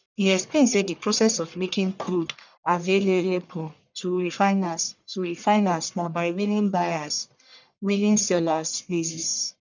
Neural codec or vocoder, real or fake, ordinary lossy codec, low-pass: codec, 44.1 kHz, 1.7 kbps, Pupu-Codec; fake; none; 7.2 kHz